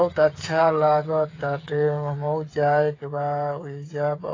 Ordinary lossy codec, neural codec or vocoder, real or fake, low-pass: AAC, 32 kbps; codec, 16 kHz, 8 kbps, FreqCodec, smaller model; fake; 7.2 kHz